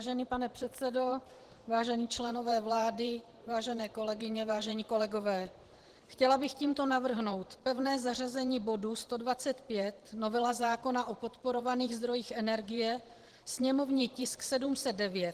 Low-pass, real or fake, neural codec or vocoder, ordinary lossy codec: 14.4 kHz; fake; vocoder, 44.1 kHz, 128 mel bands every 512 samples, BigVGAN v2; Opus, 16 kbps